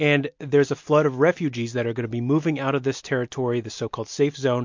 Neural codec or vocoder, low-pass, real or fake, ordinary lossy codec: none; 7.2 kHz; real; MP3, 48 kbps